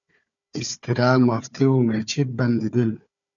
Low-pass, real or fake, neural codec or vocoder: 7.2 kHz; fake; codec, 16 kHz, 4 kbps, FunCodec, trained on Chinese and English, 50 frames a second